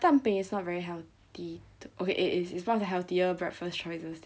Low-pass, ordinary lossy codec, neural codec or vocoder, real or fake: none; none; none; real